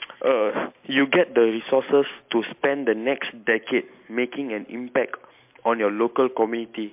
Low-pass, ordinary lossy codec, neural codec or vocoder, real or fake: 3.6 kHz; MP3, 32 kbps; none; real